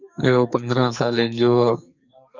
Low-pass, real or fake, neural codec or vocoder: 7.2 kHz; fake; codec, 44.1 kHz, 2.6 kbps, SNAC